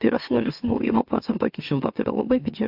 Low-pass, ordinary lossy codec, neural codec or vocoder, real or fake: 5.4 kHz; AAC, 48 kbps; autoencoder, 44.1 kHz, a latent of 192 numbers a frame, MeloTTS; fake